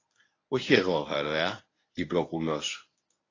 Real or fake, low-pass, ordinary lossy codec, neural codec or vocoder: fake; 7.2 kHz; AAC, 32 kbps; codec, 24 kHz, 0.9 kbps, WavTokenizer, medium speech release version 1